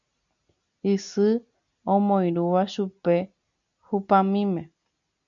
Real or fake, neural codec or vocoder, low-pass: real; none; 7.2 kHz